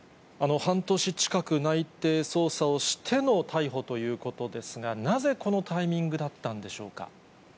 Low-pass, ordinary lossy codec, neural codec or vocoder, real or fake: none; none; none; real